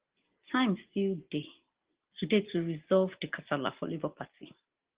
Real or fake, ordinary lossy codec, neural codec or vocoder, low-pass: real; Opus, 16 kbps; none; 3.6 kHz